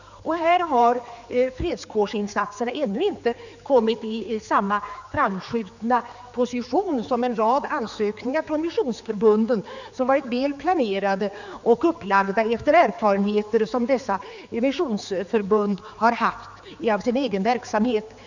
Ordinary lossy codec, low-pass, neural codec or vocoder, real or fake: none; 7.2 kHz; codec, 16 kHz, 4 kbps, X-Codec, HuBERT features, trained on general audio; fake